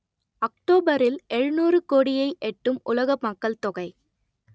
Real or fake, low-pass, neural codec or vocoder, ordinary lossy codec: real; none; none; none